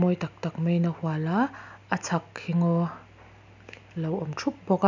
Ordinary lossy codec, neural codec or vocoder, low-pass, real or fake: none; none; 7.2 kHz; real